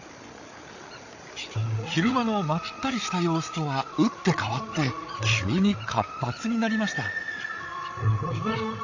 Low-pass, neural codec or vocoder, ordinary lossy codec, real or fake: 7.2 kHz; codec, 16 kHz, 8 kbps, FreqCodec, larger model; AAC, 48 kbps; fake